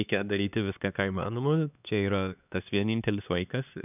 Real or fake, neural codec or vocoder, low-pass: fake; codec, 16 kHz, 2 kbps, X-Codec, HuBERT features, trained on LibriSpeech; 3.6 kHz